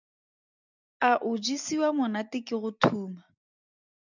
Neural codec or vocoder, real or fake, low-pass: none; real; 7.2 kHz